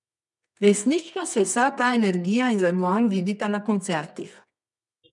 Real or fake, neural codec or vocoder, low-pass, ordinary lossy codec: fake; codec, 24 kHz, 0.9 kbps, WavTokenizer, medium music audio release; 10.8 kHz; none